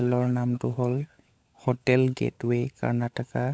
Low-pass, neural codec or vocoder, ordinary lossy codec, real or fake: none; codec, 16 kHz, 16 kbps, FunCodec, trained on LibriTTS, 50 frames a second; none; fake